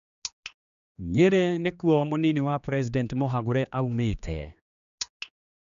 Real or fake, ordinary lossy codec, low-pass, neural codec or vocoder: fake; none; 7.2 kHz; codec, 16 kHz, 2 kbps, X-Codec, HuBERT features, trained on general audio